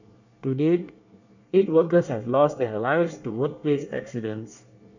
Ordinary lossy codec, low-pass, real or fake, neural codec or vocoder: none; 7.2 kHz; fake; codec, 24 kHz, 1 kbps, SNAC